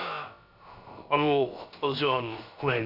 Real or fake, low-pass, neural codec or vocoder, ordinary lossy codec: fake; 5.4 kHz; codec, 16 kHz, about 1 kbps, DyCAST, with the encoder's durations; none